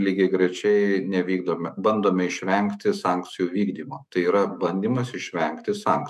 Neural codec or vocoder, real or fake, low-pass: autoencoder, 48 kHz, 128 numbers a frame, DAC-VAE, trained on Japanese speech; fake; 14.4 kHz